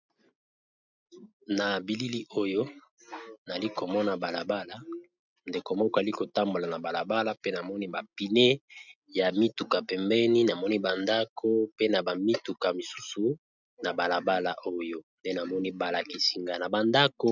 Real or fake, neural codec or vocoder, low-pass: real; none; 7.2 kHz